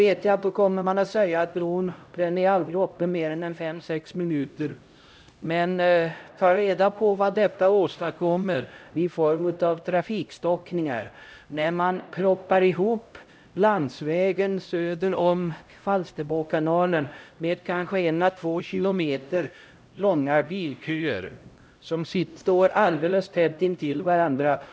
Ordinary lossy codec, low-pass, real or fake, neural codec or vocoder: none; none; fake; codec, 16 kHz, 0.5 kbps, X-Codec, HuBERT features, trained on LibriSpeech